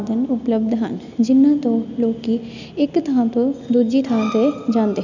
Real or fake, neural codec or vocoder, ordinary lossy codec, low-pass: real; none; none; 7.2 kHz